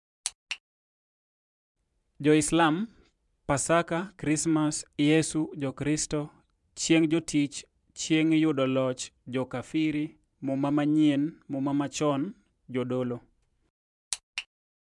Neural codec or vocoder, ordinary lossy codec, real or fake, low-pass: vocoder, 44.1 kHz, 128 mel bands every 512 samples, BigVGAN v2; MP3, 96 kbps; fake; 10.8 kHz